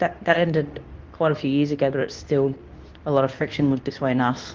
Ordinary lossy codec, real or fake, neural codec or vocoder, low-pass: Opus, 32 kbps; fake; codec, 24 kHz, 0.9 kbps, WavTokenizer, medium speech release version 2; 7.2 kHz